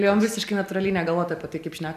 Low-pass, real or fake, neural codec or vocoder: 14.4 kHz; real; none